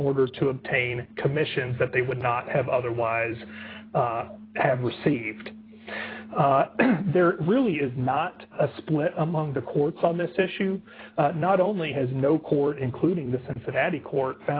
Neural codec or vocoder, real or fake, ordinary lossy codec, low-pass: vocoder, 44.1 kHz, 128 mel bands every 256 samples, BigVGAN v2; fake; AAC, 24 kbps; 5.4 kHz